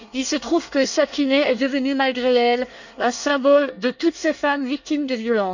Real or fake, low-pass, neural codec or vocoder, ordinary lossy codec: fake; 7.2 kHz; codec, 24 kHz, 1 kbps, SNAC; none